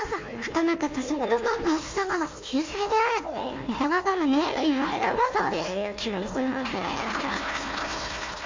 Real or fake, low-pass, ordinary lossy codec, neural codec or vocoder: fake; 7.2 kHz; MP3, 48 kbps; codec, 16 kHz, 1 kbps, FunCodec, trained on Chinese and English, 50 frames a second